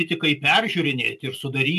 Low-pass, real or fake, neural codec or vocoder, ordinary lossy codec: 14.4 kHz; real; none; AAC, 96 kbps